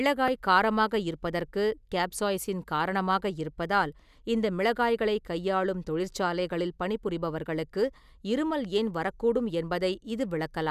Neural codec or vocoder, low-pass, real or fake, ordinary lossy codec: none; 14.4 kHz; real; none